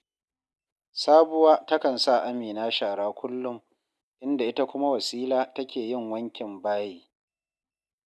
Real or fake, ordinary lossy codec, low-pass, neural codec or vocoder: real; none; none; none